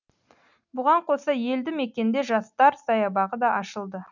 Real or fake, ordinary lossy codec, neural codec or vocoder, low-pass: real; none; none; 7.2 kHz